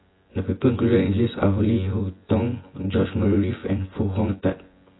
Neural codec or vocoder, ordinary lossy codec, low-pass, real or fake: vocoder, 24 kHz, 100 mel bands, Vocos; AAC, 16 kbps; 7.2 kHz; fake